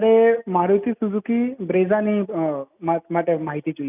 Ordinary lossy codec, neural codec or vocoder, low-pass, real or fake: none; none; 3.6 kHz; real